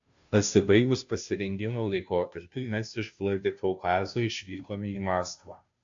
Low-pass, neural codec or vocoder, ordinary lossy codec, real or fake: 7.2 kHz; codec, 16 kHz, 0.5 kbps, FunCodec, trained on Chinese and English, 25 frames a second; AAC, 64 kbps; fake